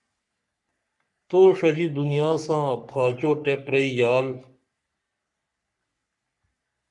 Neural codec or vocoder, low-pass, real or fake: codec, 44.1 kHz, 3.4 kbps, Pupu-Codec; 10.8 kHz; fake